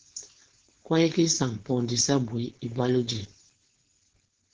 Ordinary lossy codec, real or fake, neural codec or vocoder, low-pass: Opus, 16 kbps; fake; codec, 16 kHz, 4.8 kbps, FACodec; 7.2 kHz